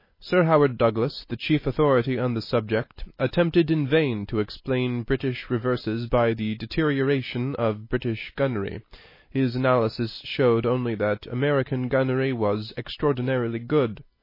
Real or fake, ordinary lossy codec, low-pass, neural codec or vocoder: real; MP3, 24 kbps; 5.4 kHz; none